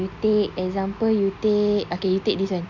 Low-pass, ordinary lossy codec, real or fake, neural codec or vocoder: 7.2 kHz; none; real; none